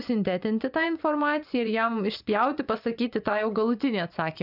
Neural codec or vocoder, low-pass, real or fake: vocoder, 24 kHz, 100 mel bands, Vocos; 5.4 kHz; fake